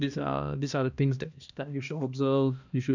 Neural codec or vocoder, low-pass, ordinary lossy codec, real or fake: codec, 16 kHz, 1 kbps, X-Codec, HuBERT features, trained on balanced general audio; 7.2 kHz; none; fake